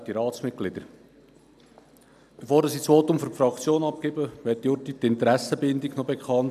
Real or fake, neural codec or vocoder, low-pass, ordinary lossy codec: real; none; 14.4 kHz; none